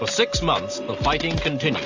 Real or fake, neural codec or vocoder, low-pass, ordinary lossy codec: real; none; 7.2 kHz; AAC, 48 kbps